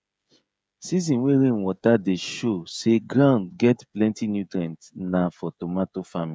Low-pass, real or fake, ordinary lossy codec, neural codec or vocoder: none; fake; none; codec, 16 kHz, 16 kbps, FreqCodec, smaller model